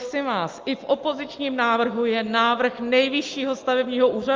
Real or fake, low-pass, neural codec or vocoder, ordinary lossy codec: real; 7.2 kHz; none; Opus, 24 kbps